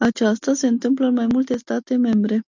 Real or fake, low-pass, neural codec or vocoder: real; 7.2 kHz; none